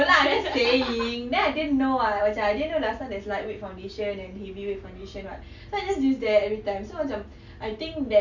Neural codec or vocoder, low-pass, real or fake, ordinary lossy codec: none; 7.2 kHz; real; none